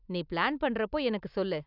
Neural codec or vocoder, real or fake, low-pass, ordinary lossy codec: none; real; 5.4 kHz; none